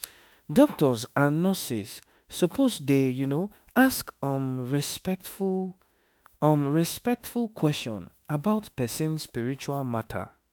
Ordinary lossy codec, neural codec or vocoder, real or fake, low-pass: none; autoencoder, 48 kHz, 32 numbers a frame, DAC-VAE, trained on Japanese speech; fake; none